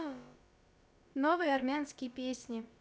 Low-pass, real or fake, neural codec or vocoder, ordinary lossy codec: none; fake; codec, 16 kHz, about 1 kbps, DyCAST, with the encoder's durations; none